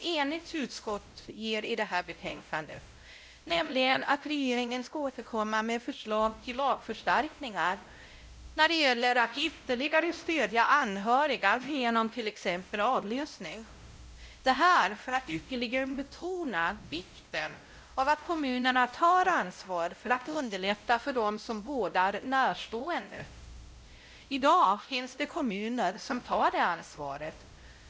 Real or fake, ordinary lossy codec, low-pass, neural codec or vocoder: fake; none; none; codec, 16 kHz, 0.5 kbps, X-Codec, WavLM features, trained on Multilingual LibriSpeech